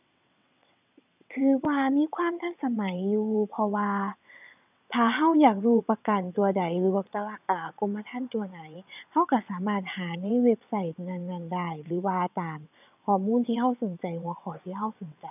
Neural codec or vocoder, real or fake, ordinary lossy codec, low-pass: vocoder, 22.05 kHz, 80 mel bands, WaveNeXt; fake; none; 3.6 kHz